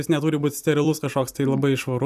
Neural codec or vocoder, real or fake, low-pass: vocoder, 44.1 kHz, 128 mel bands every 256 samples, BigVGAN v2; fake; 14.4 kHz